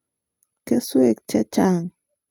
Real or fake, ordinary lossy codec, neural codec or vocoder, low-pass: real; none; none; none